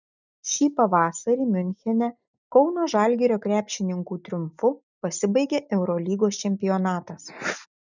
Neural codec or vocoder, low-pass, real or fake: none; 7.2 kHz; real